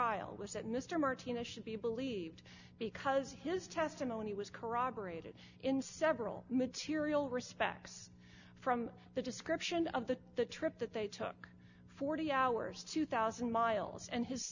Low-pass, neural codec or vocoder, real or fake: 7.2 kHz; none; real